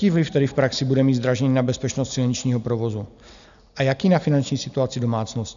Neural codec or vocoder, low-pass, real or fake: none; 7.2 kHz; real